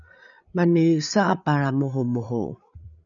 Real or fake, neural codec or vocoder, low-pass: fake; codec, 16 kHz, 8 kbps, FreqCodec, larger model; 7.2 kHz